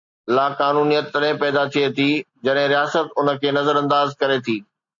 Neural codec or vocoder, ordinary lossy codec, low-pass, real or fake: none; MP3, 48 kbps; 7.2 kHz; real